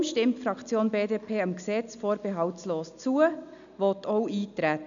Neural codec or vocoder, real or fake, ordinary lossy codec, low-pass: none; real; none; 7.2 kHz